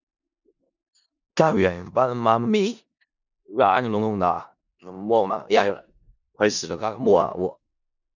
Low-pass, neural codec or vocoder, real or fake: 7.2 kHz; codec, 16 kHz in and 24 kHz out, 0.4 kbps, LongCat-Audio-Codec, four codebook decoder; fake